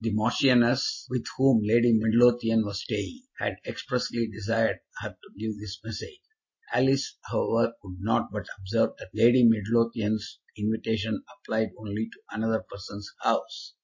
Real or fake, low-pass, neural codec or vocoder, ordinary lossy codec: real; 7.2 kHz; none; MP3, 32 kbps